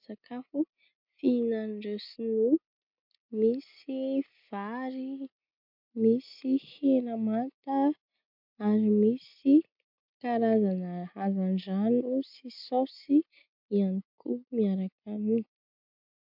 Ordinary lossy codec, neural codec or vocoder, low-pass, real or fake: MP3, 48 kbps; none; 5.4 kHz; real